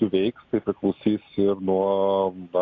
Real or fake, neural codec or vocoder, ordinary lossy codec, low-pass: real; none; AAC, 48 kbps; 7.2 kHz